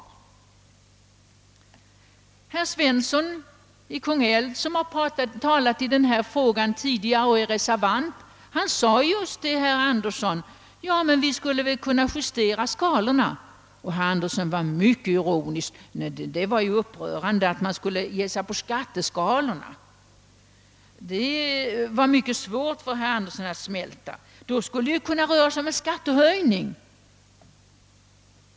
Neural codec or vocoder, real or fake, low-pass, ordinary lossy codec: none; real; none; none